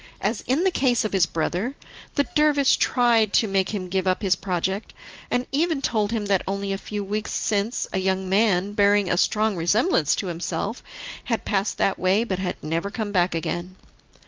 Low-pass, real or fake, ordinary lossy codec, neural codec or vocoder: 7.2 kHz; real; Opus, 24 kbps; none